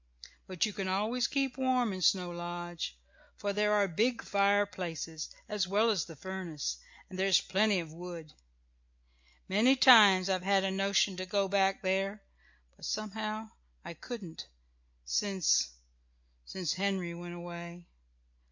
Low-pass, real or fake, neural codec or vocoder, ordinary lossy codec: 7.2 kHz; real; none; MP3, 48 kbps